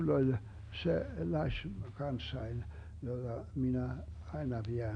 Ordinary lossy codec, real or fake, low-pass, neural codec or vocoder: none; real; 9.9 kHz; none